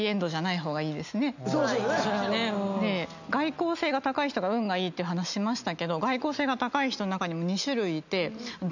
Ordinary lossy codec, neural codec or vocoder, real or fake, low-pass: none; none; real; 7.2 kHz